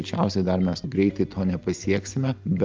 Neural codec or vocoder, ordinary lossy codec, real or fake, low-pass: none; Opus, 24 kbps; real; 7.2 kHz